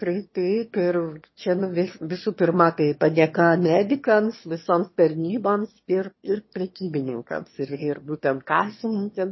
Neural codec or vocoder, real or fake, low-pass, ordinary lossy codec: autoencoder, 22.05 kHz, a latent of 192 numbers a frame, VITS, trained on one speaker; fake; 7.2 kHz; MP3, 24 kbps